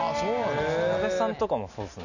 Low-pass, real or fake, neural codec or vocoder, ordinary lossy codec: 7.2 kHz; real; none; none